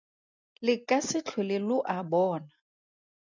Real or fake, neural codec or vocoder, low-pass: real; none; 7.2 kHz